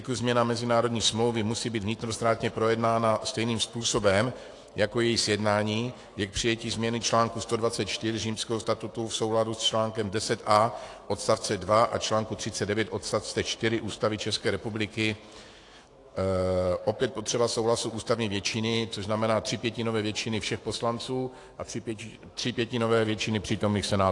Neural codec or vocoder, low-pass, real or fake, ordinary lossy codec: autoencoder, 48 kHz, 128 numbers a frame, DAC-VAE, trained on Japanese speech; 10.8 kHz; fake; AAC, 48 kbps